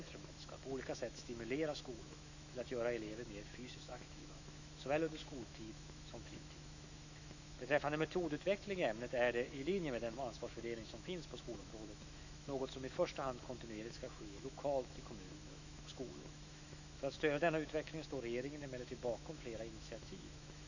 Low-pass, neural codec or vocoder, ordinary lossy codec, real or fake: 7.2 kHz; autoencoder, 48 kHz, 128 numbers a frame, DAC-VAE, trained on Japanese speech; none; fake